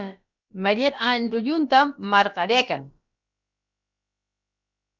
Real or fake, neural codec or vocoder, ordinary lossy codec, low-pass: fake; codec, 16 kHz, about 1 kbps, DyCAST, with the encoder's durations; Opus, 64 kbps; 7.2 kHz